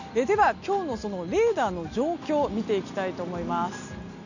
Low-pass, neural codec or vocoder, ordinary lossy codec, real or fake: 7.2 kHz; none; none; real